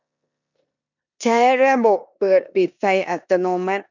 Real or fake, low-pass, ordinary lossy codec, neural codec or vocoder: fake; 7.2 kHz; none; codec, 16 kHz in and 24 kHz out, 0.9 kbps, LongCat-Audio-Codec, four codebook decoder